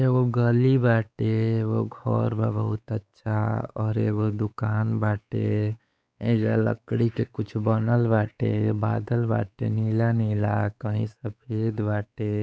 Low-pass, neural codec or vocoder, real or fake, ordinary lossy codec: none; codec, 16 kHz, 4 kbps, X-Codec, WavLM features, trained on Multilingual LibriSpeech; fake; none